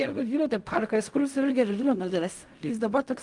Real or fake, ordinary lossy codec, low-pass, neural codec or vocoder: fake; Opus, 32 kbps; 10.8 kHz; codec, 16 kHz in and 24 kHz out, 0.4 kbps, LongCat-Audio-Codec, fine tuned four codebook decoder